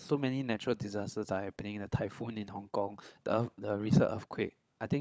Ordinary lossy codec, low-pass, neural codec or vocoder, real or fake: none; none; codec, 16 kHz, 16 kbps, FunCodec, trained on LibriTTS, 50 frames a second; fake